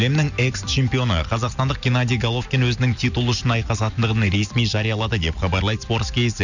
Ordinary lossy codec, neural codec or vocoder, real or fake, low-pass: none; none; real; 7.2 kHz